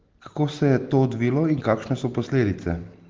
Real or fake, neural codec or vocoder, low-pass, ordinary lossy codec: real; none; 7.2 kHz; Opus, 16 kbps